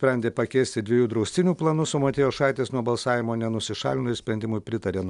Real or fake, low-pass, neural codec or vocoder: real; 10.8 kHz; none